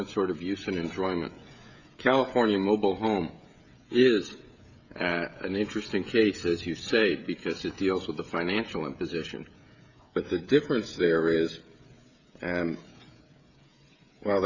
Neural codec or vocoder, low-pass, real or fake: codec, 16 kHz, 16 kbps, FreqCodec, smaller model; 7.2 kHz; fake